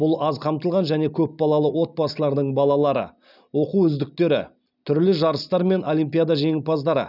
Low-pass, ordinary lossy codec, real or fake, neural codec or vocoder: 5.4 kHz; none; real; none